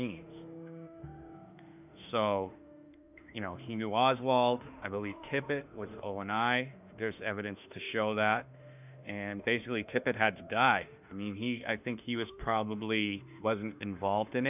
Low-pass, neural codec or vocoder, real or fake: 3.6 kHz; autoencoder, 48 kHz, 32 numbers a frame, DAC-VAE, trained on Japanese speech; fake